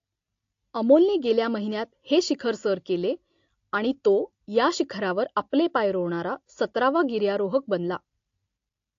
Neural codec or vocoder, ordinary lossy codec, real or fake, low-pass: none; AAC, 48 kbps; real; 7.2 kHz